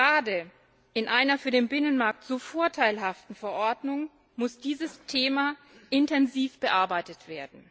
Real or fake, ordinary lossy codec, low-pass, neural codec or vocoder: real; none; none; none